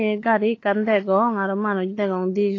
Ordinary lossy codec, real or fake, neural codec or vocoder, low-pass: AAC, 32 kbps; fake; codec, 16 kHz, 16 kbps, FunCodec, trained on LibriTTS, 50 frames a second; 7.2 kHz